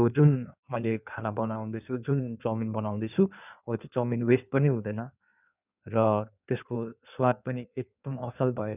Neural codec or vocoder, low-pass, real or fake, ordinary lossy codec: codec, 16 kHz in and 24 kHz out, 1.1 kbps, FireRedTTS-2 codec; 3.6 kHz; fake; none